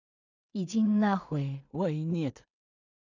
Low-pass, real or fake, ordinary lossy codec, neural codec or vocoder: 7.2 kHz; fake; none; codec, 16 kHz in and 24 kHz out, 0.4 kbps, LongCat-Audio-Codec, two codebook decoder